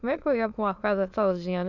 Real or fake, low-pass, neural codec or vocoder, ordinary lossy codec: fake; 7.2 kHz; autoencoder, 22.05 kHz, a latent of 192 numbers a frame, VITS, trained on many speakers; none